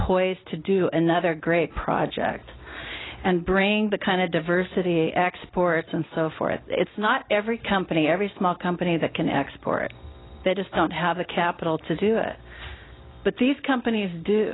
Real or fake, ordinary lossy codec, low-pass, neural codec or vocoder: real; AAC, 16 kbps; 7.2 kHz; none